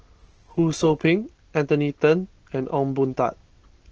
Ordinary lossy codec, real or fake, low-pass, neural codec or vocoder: Opus, 16 kbps; real; 7.2 kHz; none